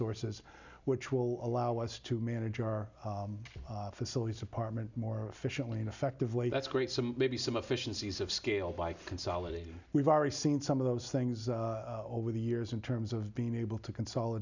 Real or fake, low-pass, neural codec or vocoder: real; 7.2 kHz; none